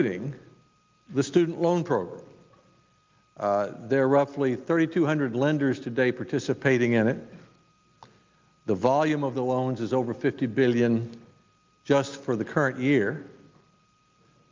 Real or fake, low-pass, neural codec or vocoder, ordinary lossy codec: real; 7.2 kHz; none; Opus, 24 kbps